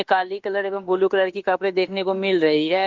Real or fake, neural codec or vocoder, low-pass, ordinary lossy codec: fake; autoencoder, 48 kHz, 32 numbers a frame, DAC-VAE, trained on Japanese speech; 7.2 kHz; Opus, 16 kbps